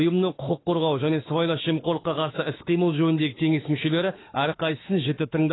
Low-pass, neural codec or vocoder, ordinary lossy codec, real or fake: 7.2 kHz; autoencoder, 48 kHz, 32 numbers a frame, DAC-VAE, trained on Japanese speech; AAC, 16 kbps; fake